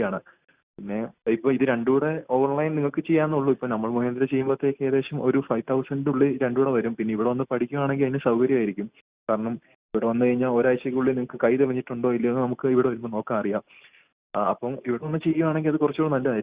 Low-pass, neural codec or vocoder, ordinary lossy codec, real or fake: 3.6 kHz; none; Opus, 64 kbps; real